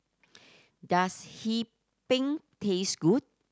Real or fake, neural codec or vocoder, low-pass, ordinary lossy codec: real; none; none; none